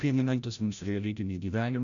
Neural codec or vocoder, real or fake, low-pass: codec, 16 kHz, 0.5 kbps, FreqCodec, larger model; fake; 7.2 kHz